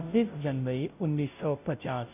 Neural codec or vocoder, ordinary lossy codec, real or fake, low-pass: codec, 16 kHz, 0.5 kbps, FunCodec, trained on Chinese and English, 25 frames a second; none; fake; 3.6 kHz